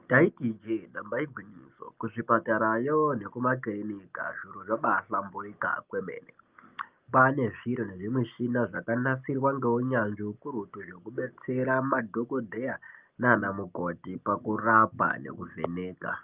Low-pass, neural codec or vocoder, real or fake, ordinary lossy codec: 3.6 kHz; none; real; Opus, 24 kbps